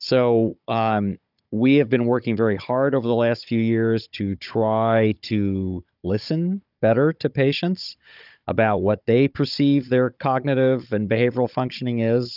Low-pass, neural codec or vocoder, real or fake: 5.4 kHz; codec, 16 kHz, 16 kbps, FunCodec, trained on Chinese and English, 50 frames a second; fake